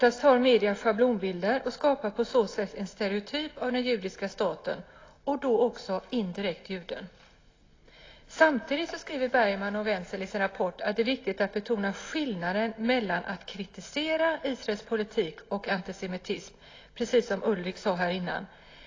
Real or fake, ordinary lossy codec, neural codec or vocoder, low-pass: real; AAC, 32 kbps; none; 7.2 kHz